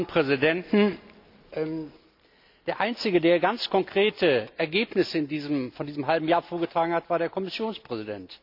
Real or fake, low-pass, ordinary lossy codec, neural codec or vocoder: real; 5.4 kHz; none; none